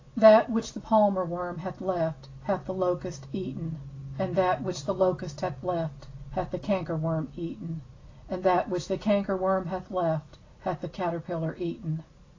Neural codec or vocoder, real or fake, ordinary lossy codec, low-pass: none; real; AAC, 32 kbps; 7.2 kHz